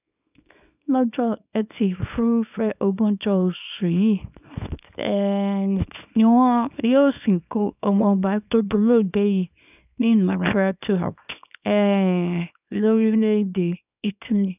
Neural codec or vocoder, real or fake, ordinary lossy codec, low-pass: codec, 24 kHz, 0.9 kbps, WavTokenizer, small release; fake; none; 3.6 kHz